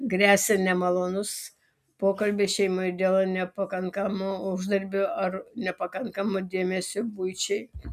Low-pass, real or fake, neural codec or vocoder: 14.4 kHz; real; none